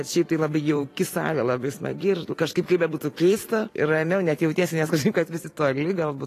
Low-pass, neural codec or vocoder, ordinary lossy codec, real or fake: 14.4 kHz; vocoder, 44.1 kHz, 128 mel bands every 512 samples, BigVGAN v2; AAC, 48 kbps; fake